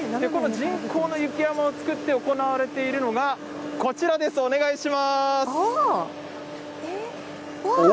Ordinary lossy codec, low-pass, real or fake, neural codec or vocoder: none; none; real; none